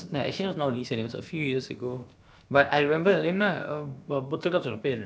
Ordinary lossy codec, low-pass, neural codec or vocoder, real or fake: none; none; codec, 16 kHz, about 1 kbps, DyCAST, with the encoder's durations; fake